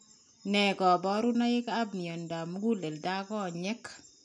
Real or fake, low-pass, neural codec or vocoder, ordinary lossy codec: real; 10.8 kHz; none; none